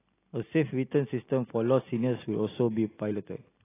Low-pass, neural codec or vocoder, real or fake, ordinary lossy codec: 3.6 kHz; none; real; AAC, 16 kbps